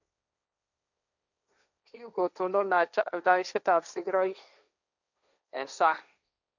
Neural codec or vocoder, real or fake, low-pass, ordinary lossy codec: codec, 16 kHz, 1.1 kbps, Voila-Tokenizer; fake; 7.2 kHz; none